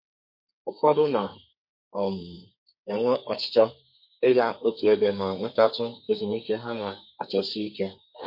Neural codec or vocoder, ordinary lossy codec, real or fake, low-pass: codec, 32 kHz, 1.9 kbps, SNAC; MP3, 32 kbps; fake; 5.4 kHz